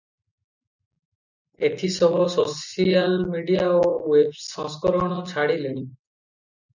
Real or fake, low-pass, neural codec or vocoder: real; 7.2 kHz; none